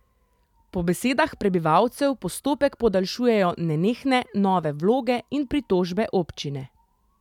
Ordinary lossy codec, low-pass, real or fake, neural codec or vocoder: none; 19.8 kHz; real; none